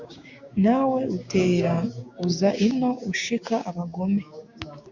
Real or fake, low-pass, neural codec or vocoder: fake; 7.2 kHz; codec, 16 kHz, 6 kbps, DAC